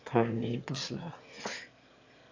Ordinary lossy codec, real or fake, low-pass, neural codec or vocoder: AAC, 48 kbps; fake; 7.2 kHz; autoencoder, 22.05 kHz, a latent of 192 numbers a frame, VITS, trained on one speaker